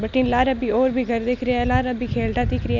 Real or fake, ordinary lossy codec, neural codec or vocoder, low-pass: real; none; none; 7.2 kHz